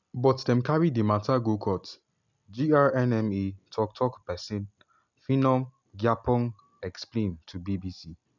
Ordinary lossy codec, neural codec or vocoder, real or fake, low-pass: none; none; real; 7.2 kHz